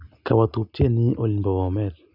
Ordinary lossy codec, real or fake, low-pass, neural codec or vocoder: none; real; 5.4 kHz; none